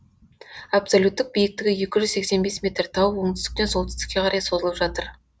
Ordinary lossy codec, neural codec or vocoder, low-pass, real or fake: none; none; none; real